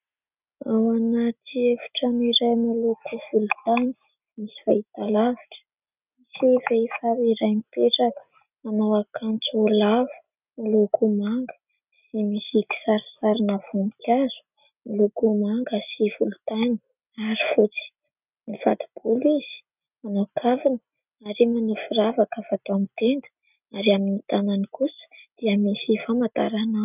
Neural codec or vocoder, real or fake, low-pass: none; real; 3.6 kHz